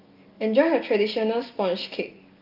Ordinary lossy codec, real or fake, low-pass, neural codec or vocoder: Opus, 24 kbps; real; 5.4 kHz; none